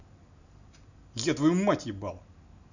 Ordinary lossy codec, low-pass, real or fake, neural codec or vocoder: none; 7.2 kHz; real; none